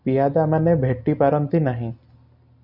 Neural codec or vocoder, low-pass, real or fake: none; 5.4 kHz; real